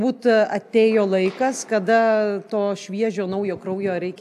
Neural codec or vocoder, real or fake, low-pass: none; real; 14.4 kHz